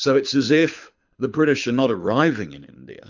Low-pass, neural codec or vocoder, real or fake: 7.2 kHz; codec, 24 kHz, 6 kbps, HILCodec; fake